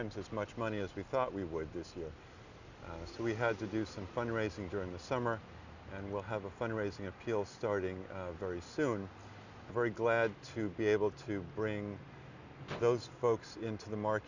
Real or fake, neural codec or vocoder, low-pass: real; none; 7.2 kHz